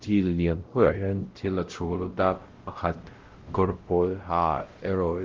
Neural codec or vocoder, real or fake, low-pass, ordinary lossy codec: codec, 16 kHz, 0.5 kbps, X-Codec, WavLM features, trained on Multilingual LibriSpeech; fake; 7.2 kHz; Opus, 16 kbps